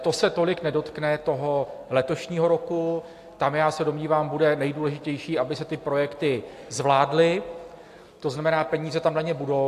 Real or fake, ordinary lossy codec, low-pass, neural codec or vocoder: real; AAC, 64 kbps; 14.4 kHz; none